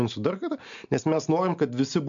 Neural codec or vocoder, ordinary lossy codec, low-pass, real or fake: none; MP3, 64 kbps; 7.2 kHz; real